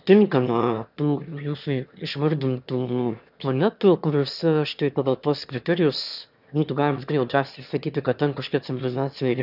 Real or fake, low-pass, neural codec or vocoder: fake; 5.4 kHz; autoencoder, 22.05 kHz, a latent of 192 numbers a frame, VITS, trained on one speaker